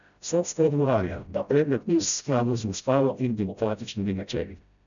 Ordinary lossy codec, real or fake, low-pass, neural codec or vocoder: none; fake; 7.2 kHz; codec, 16 kHz, 0.5 kbps, FreqCodec, smaller model